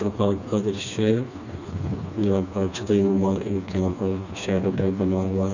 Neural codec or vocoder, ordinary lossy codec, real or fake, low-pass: codec, 16 kHz, 2 kbps, FreqCodec, smaller model; none; fake; 7.2 kHz